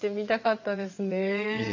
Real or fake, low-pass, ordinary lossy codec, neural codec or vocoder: fake; 7.2 kHz; none; vocoder, 22.05 kHz, 80 mel bands, WaveNeXt